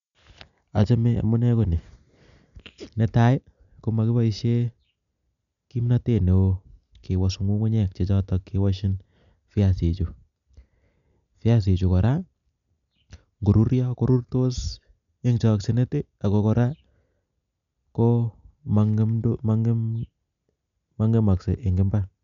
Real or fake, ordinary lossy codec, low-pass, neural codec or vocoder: real; none; 7.2 kHz; none